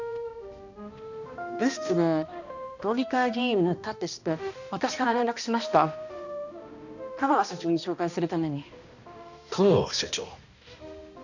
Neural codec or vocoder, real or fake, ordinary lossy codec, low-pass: codec, 16 kHz, 1 kbps, X-Codec, HuBERT features, trained on balanced general audio; fake; none; 7.2 kHz